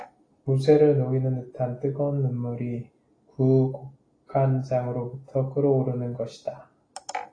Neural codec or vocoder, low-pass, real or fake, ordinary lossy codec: none; 9.9 kHz; real; AAC, 32 kbps